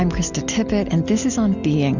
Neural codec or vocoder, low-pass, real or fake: none; 7.2 kHz; real